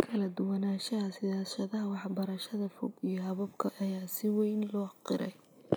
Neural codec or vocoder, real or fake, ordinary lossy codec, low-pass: none; real; none; none